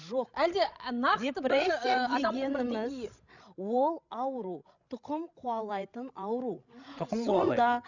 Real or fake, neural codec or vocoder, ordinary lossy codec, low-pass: fake; codec, 16 kHz, 16 kbps, FreqCodec, larger model; none; 7.2 kHz